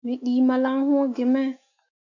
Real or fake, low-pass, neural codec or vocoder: fake; 7.2 kHz; autoencoder, 48 kHz, 128 numbers a frame, DAC-VAE, trained on Japanese speech